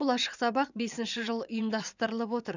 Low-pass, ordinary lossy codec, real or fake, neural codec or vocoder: 7.2 kHz; none; fake; vocoder, 22.05 kHz, 80 mel bands, WaveNeXt